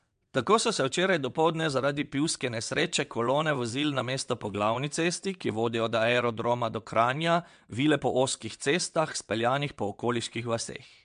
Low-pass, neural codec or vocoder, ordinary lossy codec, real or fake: 9.9 kHz; vocoder, 22.05 kHz, 80 mel bands, WaveNeXt; MP3, 64 kbps; fake